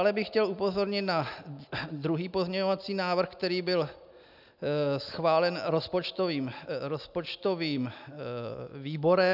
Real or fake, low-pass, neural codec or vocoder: real; 5.4 kHz; none